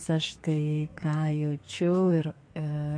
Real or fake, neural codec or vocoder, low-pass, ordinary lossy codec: fake; codec, 32 kHz, 1.9 kbps, SNAC; 9.9 kHz; MP3, 48 kbps